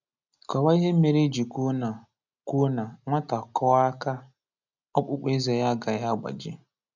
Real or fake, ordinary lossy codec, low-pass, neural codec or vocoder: real; none; 7.2 kHz; none